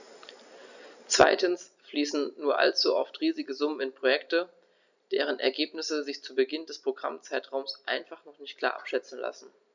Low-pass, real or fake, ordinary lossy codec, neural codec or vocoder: 7.2 kHz; real; none; none